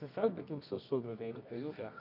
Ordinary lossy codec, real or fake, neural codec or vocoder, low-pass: none; fake; codec, 24 kHz, 0.9 kbps, WavTokenizer, medium music audio release; 5.4 kHz